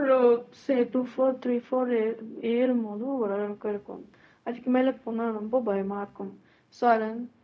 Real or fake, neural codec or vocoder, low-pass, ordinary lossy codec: fake; codec, 16 kHz, 0.4 kbps, LongCat-Audio-Codec; none; none